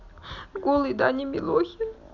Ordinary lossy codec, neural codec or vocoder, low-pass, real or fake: none; none; 7.2 kHz; real